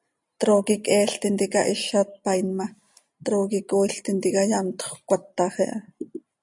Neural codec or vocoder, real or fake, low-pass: none; real; 10.8 kHz